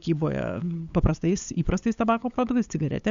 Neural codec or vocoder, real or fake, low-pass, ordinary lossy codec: codec, 16 kHz, 8 kbps, FunCodec, trained on LibriTTS, 25 frames a second; fake; 7.2 kHz; Opus, 64 kbps